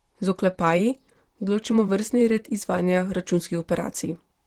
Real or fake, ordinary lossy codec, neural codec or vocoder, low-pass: fake; Opus, 16 kbps; vocoder, 44.1 kHz, 128 mel bands, Pupu-Vocoder; 19.8 kHz